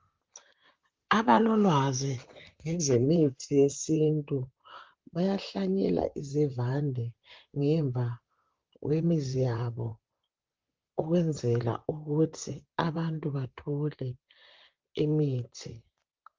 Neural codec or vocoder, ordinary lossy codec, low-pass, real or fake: vocoder, 44.1 kHz, 128 mel bands, Pupu-Vocoder; Opus, 32 kbps; 7.2 kHz; fake